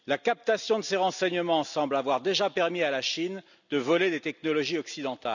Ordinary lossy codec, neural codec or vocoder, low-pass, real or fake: none; none; 7.2 kHz; real